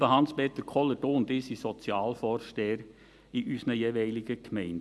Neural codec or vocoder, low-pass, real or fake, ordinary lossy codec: none; none; real; none